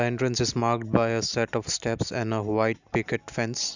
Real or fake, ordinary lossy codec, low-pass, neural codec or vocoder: real; none; 7.2 kHz; none